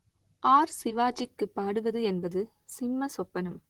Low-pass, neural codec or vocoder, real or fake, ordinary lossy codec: 19.8 kHz; vocoder, 44.1 kHz, 128 mel bands, Pupu-Vocoder; fake; Opus, 16 kbps